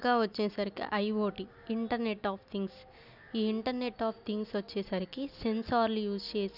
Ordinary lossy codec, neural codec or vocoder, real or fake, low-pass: none; none; real; 5.4 kHz